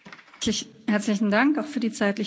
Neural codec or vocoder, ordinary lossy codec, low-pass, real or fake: none; none; none; real